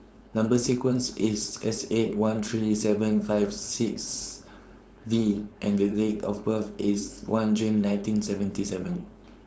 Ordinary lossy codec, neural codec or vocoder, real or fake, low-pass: none; codec, 16 kHz, 4.8 kbps, FACodec; fake; none